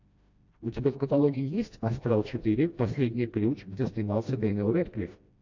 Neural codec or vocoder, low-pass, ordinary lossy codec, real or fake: codec, 16 kHz, 1 kbps, FreqCodec, smaller model; 7.2 kHz; AAC, 48 kbps; fake